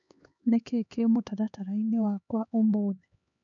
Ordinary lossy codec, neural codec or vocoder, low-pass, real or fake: none; codec, 16 kHz, 4 kbps, X-Codec, HuBERT features, trained on LibriSpeech; 7.2 kHz; fake